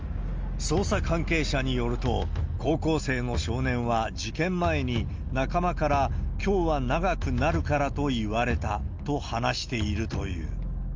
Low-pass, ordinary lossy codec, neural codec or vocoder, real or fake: 7.2 kHz; Opus, 24 kbps; none; real